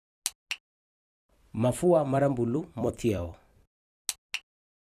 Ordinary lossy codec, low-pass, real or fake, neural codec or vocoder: none; 14.4 kHz; fake; vocoder, 44.1 kHz, 128 mel bands every 256 samples, BigVGAN v2